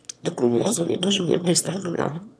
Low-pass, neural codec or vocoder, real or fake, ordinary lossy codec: none; autoencoder, 22.05 kHz, a latent of 192 numbers a frame, VITS, trained on one speaker; fake; none